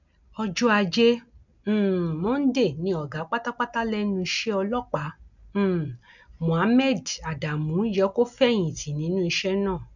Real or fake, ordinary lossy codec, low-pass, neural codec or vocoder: real; none; 7.2 kHz; none